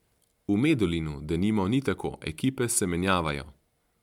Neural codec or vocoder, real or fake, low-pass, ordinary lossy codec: none; real; 19.8 kHz; MP3, 96 kbps